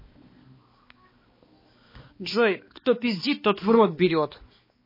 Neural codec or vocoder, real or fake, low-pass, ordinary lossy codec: codec, 16 kHz, 2 kbps, X-Codec, HuBERT features, trained on balanced general audio; fake; 5.4 kHz; MP3, 24 kbps